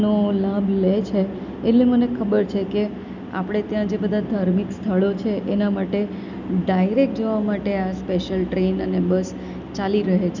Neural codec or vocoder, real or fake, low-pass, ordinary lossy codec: none; real; 7.2 kHz; none